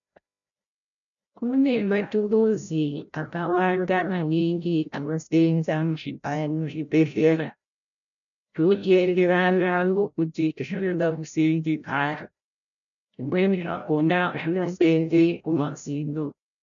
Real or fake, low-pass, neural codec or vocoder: fake; 7.2 kHz; codec, 16 kHz, 0.5 kbps, FreqCodec, larger model